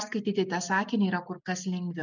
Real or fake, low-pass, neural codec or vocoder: real; 7.2 kHz; none